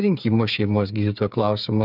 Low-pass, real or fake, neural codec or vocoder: 5.4 kHz; fake; codec, 16 kHz, 8 kbps, FreqCodec, smaller model